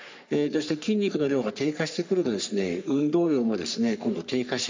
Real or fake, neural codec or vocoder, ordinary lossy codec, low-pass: fake; codec, 44.1 kHz, 3.4 kbps, Pupu-Codec; MP3, 64 kbps; 7.2 kHz